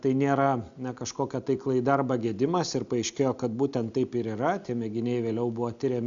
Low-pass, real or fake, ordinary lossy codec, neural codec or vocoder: 7.2 kHz; real; Opus, 64 kbps; none